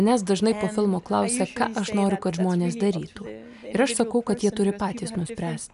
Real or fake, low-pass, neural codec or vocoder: real; 10.8 kHz; none